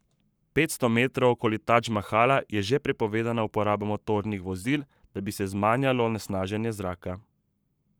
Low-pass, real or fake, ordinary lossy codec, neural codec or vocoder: none; fake; none; codec, 44.1 kHz, 7.8 kbps, Pupu-Codec